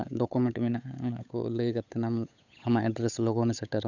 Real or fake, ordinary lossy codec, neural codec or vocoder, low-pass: fake; none; codec, 16 kHz, 8 kbps, FunCodec, trained on Chinese and English, 25 frames a second; 7.2 kHz